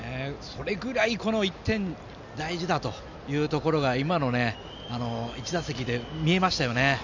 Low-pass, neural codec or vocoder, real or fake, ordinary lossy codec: 7.2 kHz; none; real; none